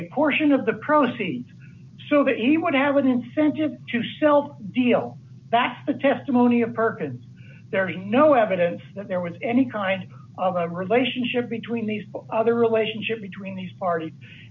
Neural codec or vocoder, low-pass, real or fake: none; 7.2 kHz; real